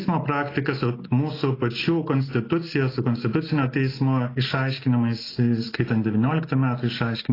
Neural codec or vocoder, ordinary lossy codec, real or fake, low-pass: none; AAC, 24 kbps; real; 5.4 kHz